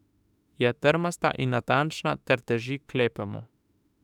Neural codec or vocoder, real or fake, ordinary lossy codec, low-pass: autoencoder, 48 kHz, 32 numbers a frame, DAC-VAE, trained on Japanese speech; fake; none; 19.8 kHz